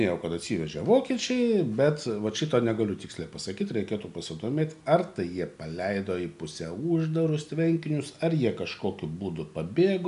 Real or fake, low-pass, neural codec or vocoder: real; 10.8 kHz; none